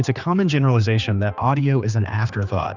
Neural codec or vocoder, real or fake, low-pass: codec, 16 kHz, 2 kbps, X-Codec, HuBERT features, trained on general audio; fake; 7.2 kHz